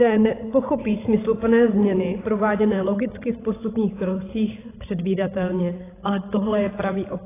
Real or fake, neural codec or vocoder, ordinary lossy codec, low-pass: fake; codec, 16 kHz, 16 kbps, FreqCodec, larger model; AAC, 16 kbps; 3.6 kHz